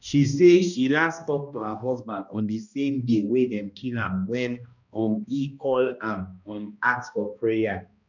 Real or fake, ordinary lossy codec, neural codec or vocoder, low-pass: fake; none; codec, 16 kHz, 1 kbps, X-Codec, HuBERT features, trained on balanced general audio; 7.2 kHz